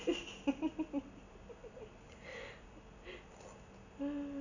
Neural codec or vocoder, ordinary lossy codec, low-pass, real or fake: none; none; 7.2 kHz; real